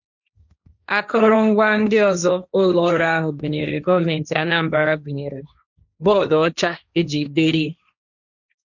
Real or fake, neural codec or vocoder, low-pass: fake; codec, 16 kHz, 1.1 kbps, Voila-Tokenizer; 7.2 kHz